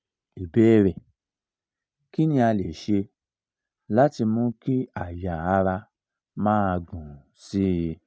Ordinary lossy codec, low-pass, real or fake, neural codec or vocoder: none; none; real; none